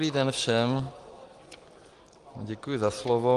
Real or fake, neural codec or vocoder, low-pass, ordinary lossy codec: real; none; 10.8 kHz; Opus, 24 kbps